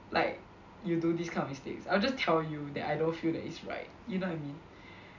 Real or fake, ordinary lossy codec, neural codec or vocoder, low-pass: real; none; none; 7.2 kHz